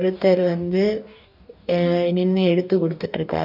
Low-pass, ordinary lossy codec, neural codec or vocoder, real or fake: 5.4 kHz; none; codec, 44.1 kHz, 2.6 kbps, DAC; fake